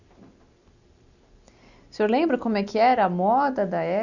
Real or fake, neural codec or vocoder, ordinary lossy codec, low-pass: real; none; none; 7.2 kHz